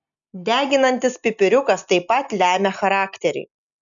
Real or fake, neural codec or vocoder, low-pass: real; none; 7.2 kHz